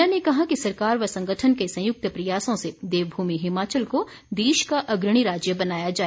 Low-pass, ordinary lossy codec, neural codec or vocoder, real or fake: none; none; none; real